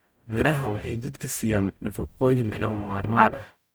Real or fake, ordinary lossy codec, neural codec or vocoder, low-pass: fake; none; codec, 44.1 kHz, 0.9 kbps, DAC; none